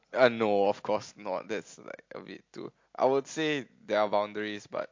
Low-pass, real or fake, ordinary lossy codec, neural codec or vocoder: 7.2 kHz; real; MP3, 48 kbps; none